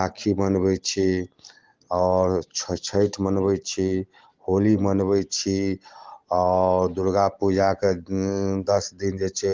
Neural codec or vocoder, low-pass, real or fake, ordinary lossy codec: none; 7.2 kHz; real; Opus, 16 kbps